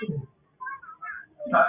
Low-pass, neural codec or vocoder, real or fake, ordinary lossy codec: 3.6 kHz; none; real; Opus, 64 kbps